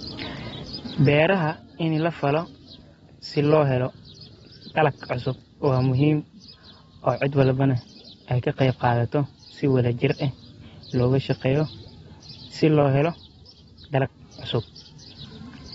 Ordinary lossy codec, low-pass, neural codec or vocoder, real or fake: AAC, 24 kbps; 19.8 kHz; none; real